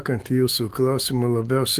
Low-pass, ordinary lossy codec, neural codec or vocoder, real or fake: 14.4 kHz; Opus, 24 kbps; autoencoder, 48 kHz, 128 numbers a frame, DAC-VAE, trained on Japanese speech; fake